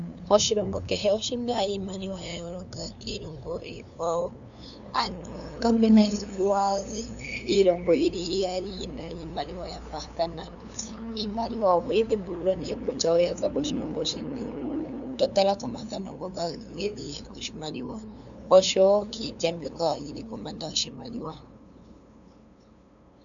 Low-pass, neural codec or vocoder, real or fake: 7.2 kHz; codec, 16 kHz, 2 kbps, FunCodec, trained on LibriTTS, 25 frames a second; fake